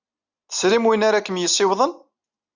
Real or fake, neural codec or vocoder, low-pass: real; none; 7.2 kHz